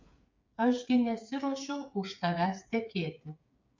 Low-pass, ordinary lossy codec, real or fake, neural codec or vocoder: 7.2 kHz; MP3, 48 kbps; fake; codec, 16 kHz, 8 kbps, FreqCodec, smaller model